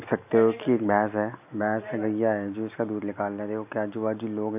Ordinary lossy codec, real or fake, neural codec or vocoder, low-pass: none; real; none; 3.6 kHz